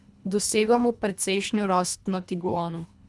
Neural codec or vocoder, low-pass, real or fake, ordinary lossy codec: codec, 24 kHz, 1.5 kbps, HILCodec; none; fake; none